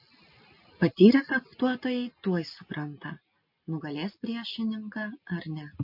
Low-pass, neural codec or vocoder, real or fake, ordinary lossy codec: 5.4 kHz; none; real; MP3, 32 kbps